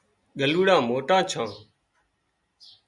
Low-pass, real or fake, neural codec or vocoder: 10.8 kHz; real; none